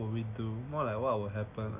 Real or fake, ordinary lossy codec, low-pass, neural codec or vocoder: real; none; 3.6 kHz; none